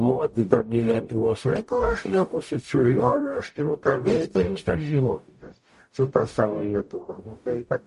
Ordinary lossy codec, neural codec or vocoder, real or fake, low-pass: MP3, 48 kbps; codec, 44.1 kHz, 0.9 kbps, DAC; fake; 14.4 kHz